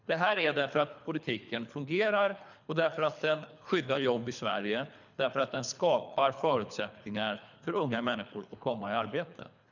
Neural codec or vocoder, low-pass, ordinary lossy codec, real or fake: codec, 24 kHz, 3 kbps, HILCodec; 7.2 kHz; none; fake